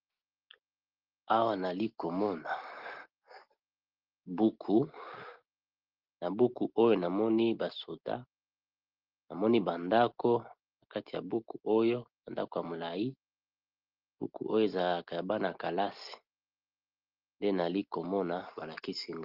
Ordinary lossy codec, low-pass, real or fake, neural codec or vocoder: Opus, 16 kbps; 5.4 kHz; real; none